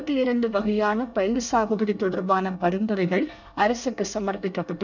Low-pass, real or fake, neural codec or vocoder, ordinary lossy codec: 7.2 kHz; fake; codec, 24 kHz, 1 kbps, SNAC; none